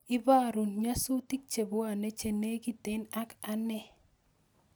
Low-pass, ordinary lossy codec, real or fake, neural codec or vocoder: none; none; real; none